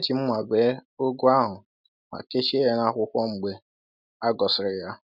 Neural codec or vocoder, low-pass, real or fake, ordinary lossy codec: none; 5.4 kHz; real; none